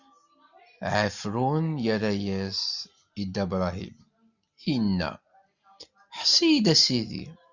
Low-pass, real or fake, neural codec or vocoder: 7.2 kHz; real; none